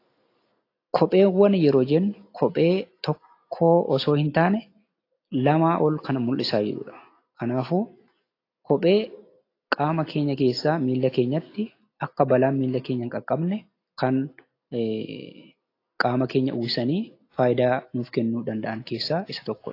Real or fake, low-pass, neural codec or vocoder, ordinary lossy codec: real; 5.4 kHz; none; AAC, 32 kbps